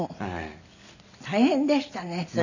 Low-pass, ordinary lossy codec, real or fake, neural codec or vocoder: 7.2 kHz; none; real; none